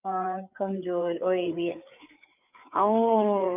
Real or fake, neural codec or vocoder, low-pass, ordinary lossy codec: fake; codec, 16 kHz, 4 kbps, FreqCodec, larger model; 3.6 kHz; none